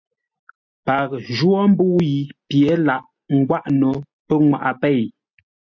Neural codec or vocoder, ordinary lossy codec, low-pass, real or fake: none; MP3, 48 kbps; 7.2 kHz; real